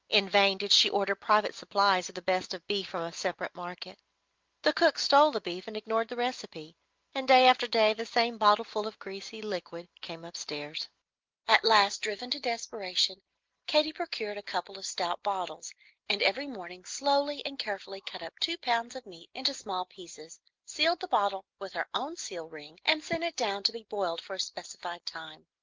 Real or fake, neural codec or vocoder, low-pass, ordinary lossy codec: real; none; 7.2 kHz; Opus, 32 kbps